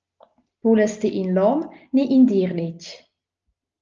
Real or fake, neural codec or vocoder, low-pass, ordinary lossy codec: real; none; 7.2 kHz; Opus, 24 kbps